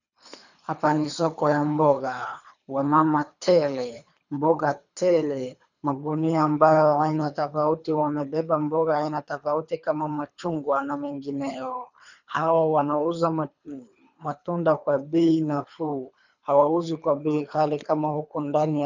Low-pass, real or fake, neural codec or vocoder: 7.2 kHz; fake; codec, 24 kHz, 3 kbps, HILCodec